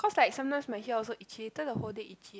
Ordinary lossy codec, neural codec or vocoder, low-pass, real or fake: none; none; none; real